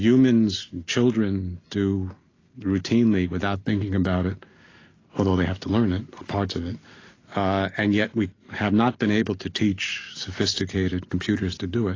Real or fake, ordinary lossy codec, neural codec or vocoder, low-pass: real; AAC, 32 kbps; none; 7.2 kHz